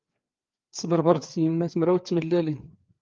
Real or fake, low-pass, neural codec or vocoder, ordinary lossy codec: fake; 7.2 kHz; codec, 16 kHz, 4 kbps, FreqCodec, larger model; Opus, 32 kbps